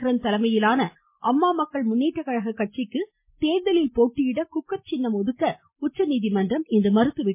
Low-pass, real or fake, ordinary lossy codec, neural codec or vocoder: 3.6 kHz; real; MP3, 24 kbps; none